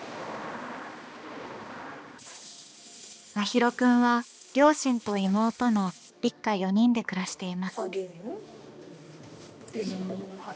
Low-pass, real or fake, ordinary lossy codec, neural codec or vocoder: none; fake; none; codec, 16 kHz, 2 kbps, X-Codec, HuBERT features, trained on balanced general audio